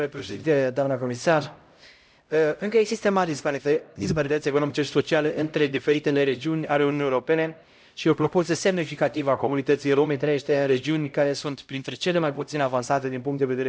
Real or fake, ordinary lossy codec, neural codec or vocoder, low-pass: fake; none; codec, 16 kHz, 0.5 kbps, X-Codec, HuBERT features, trained on LibriSpeech; none